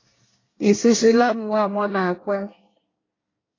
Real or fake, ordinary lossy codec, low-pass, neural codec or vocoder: fake; AAC, 32 kbps; 7.2 kHz; codec, 24 kHz, 1 kbps, SNAC